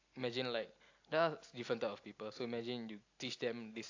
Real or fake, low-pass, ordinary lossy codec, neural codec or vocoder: real; 7.2 kHz; AAC, 48 kbps; none